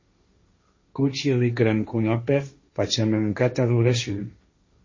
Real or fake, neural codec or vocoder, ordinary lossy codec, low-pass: fake; codec, 16 kHz, 1.1 kbps, Voila-Tokenizer; MP3, 32 kbps; 7.2 kHz